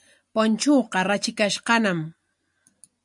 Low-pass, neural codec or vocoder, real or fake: 10.8 kHz; none; real